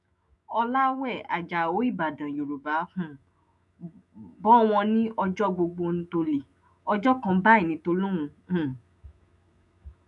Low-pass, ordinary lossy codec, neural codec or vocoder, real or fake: 10.8 kHz; none; autoencoder, 48 kHz, 128 numbers a frame, DAC-VAE, trained on Japanese speech; fake